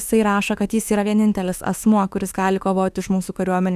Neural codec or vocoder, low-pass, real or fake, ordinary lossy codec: autoencoder, 48 kHz, 32 numbers a frame, DAC-VAE, trained on Japanese speech; 14.4 kHz; fake; Opus, 64 kbps